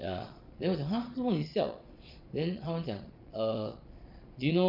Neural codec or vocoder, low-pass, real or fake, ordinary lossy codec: vocoder, 22.05 kHz, 80 mel bands, Vocos; 5.4 kHz; fake; MP3, 48 kbps